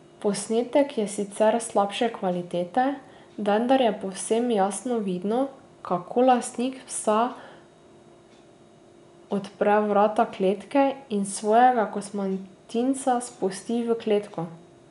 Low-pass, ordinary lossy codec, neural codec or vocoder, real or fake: 10.8 kHz; none; none; real